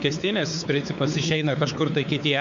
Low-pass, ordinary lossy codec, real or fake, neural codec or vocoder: 7.2 kHz; MP3, 64 kbps; fake; codec, 16 kHz, 4 kbps, X-Codec, WavLM features, trained on Multilingual LibriSpeech